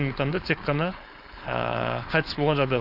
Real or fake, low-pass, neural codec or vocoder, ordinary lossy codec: fake; 5.4 kHz; codec, 16 kHz, 4.8 kbps, FACodec; Opus, 64 kbps